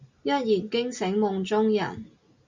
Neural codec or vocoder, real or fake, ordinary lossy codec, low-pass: none; real; MP3, 64 kbps; 7.2 kHz